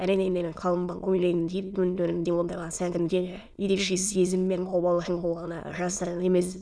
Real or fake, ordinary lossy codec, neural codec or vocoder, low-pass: fake; none; autoencoder, 22.05 kHz, a latent of 192 numbers a frame, VITS, trained on many speakers; none